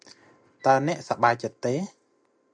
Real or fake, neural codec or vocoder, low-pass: real; none; 9.9 kHz